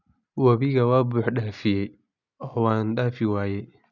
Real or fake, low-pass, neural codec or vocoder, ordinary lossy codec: real; 7.2 kHz; none; none